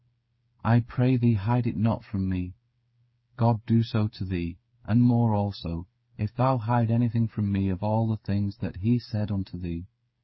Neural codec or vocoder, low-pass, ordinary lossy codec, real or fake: codec, 16 kHz, 8 kbps, FreqCodec, smaller model; 7.2 kHz; MP3, 24 kbps; fake